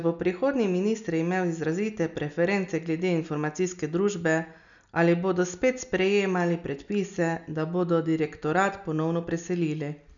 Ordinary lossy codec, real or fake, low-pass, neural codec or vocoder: none; real; 7.2 kHz; none